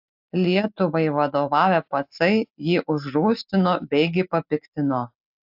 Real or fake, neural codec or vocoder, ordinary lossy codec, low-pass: real; none; AAC, 48 kbps; 5.4 kHz